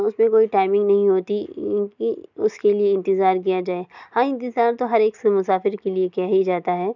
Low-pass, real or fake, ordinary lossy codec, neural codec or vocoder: 7.2 kHz; real; none; none